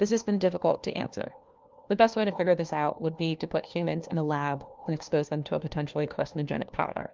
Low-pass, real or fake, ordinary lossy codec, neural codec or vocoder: 7.2 kHz; fake; Opus, 24 kbps; codec, 16 kHz, 1 kbps, FunCodec, trained on LibriTTS, 50 frames a second